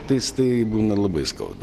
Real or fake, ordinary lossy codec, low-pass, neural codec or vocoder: real; Opus, 16 kbps; 14.4 kHz; none